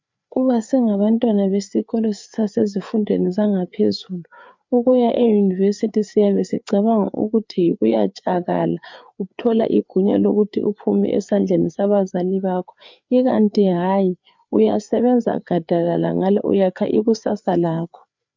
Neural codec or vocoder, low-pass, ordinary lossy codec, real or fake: codec, 16 kHz, 4 kbps, FreqCodec, larger model; 7.2 kHz; MP3, 64 kbps; fake